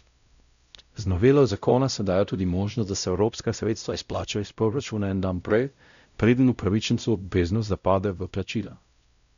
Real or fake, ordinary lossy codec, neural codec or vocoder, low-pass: fake; none; codec, 16 kHz, 0.5 kbps, X-Codec, WavLM features, trained on Multilingual LibriSpeech; 7.2 kHz